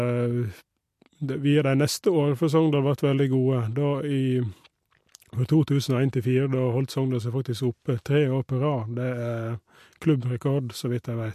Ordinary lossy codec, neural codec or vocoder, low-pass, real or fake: MP3, 64 kbps; none; 14.4 kHz; real